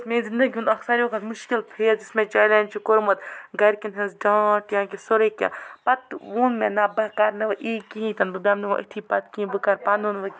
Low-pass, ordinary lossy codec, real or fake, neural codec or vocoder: none; none; real; none